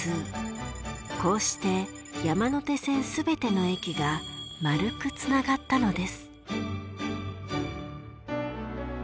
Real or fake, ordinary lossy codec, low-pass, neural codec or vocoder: real; none; none; none